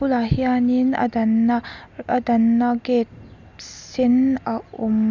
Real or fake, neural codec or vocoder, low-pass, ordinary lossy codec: real; none; 7.2 kHz; none